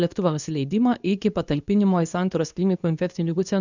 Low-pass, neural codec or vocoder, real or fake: 7.2 kHz; codec, 24 kHz, 0.9 kbps, WavTokenizer, medium speech release version 2; fake